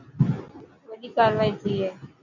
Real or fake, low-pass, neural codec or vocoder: real; 7.2 kHz; none